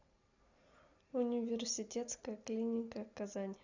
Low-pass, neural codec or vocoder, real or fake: 7.2 kHz; none; real